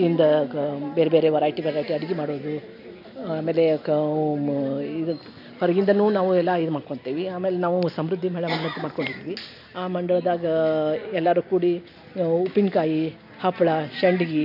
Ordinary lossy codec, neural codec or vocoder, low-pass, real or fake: none; none; 5.4 kHz; real